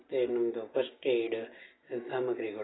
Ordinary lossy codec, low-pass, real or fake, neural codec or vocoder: AAC, 16 kbps; 7.2 kHz; real; none